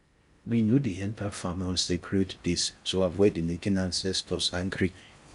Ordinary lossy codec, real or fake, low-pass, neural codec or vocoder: none; fake; 10.8 kHz; codec, 16 kHz in and 24 kHz out, 0.6 kbps, FocalCodec, streaming, 4096 codes